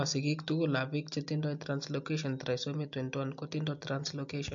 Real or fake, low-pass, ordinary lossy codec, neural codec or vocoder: real; 7.2 kHz; MP3, 48 kbps; none